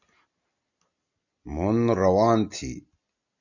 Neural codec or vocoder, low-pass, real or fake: none; 7.2 kHz; real